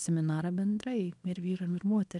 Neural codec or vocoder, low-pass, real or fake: codec, 24 kHz, 0.9 kbps, WavTokenizer, small release; 10.8 kHz; fake